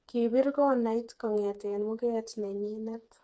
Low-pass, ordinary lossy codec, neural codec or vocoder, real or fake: none; none; codec, 16 kHz, 4 kbps, FreqCodec, smaller model; fake